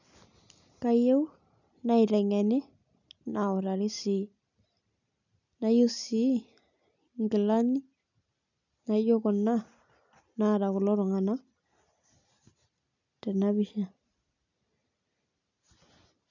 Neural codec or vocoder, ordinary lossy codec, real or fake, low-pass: none; none; real; 7.2 kHz